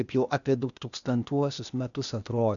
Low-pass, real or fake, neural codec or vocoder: 7.2 kHz; fake; codec, 16 kHz, 0.8 kbps, ZipCodec